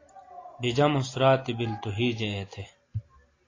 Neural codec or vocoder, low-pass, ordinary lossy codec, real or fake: none; 7.2 kHz; AAC, 32 kbps; real